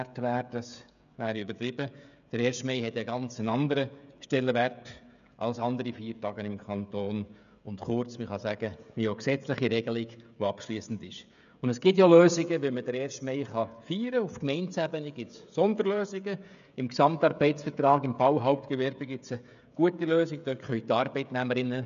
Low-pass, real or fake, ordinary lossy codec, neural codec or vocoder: 7.2 kHz; fake; none; codec, 16 kHz, 16 kbps, FreqCodec, smaller model